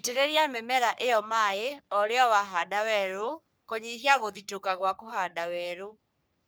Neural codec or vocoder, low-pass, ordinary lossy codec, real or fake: codec, 44.1 kHz, 3.4 kbps, Pupu-Codec; none; none; fake